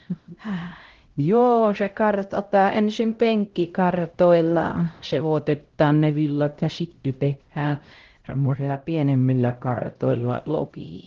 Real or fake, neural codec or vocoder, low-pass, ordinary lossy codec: fake; codec, 16 kHz, 0.5 kbps, X-Codec, HuBERT features, trained on LibriSpeech; 7.2 kHz; Opus, 16 kbps